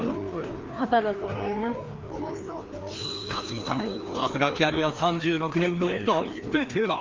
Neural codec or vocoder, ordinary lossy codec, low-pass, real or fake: codec, 16 kHz, 2 kbps, FreqCodec, larger model; Opus, 24 kbps; 7.2 kHz; fake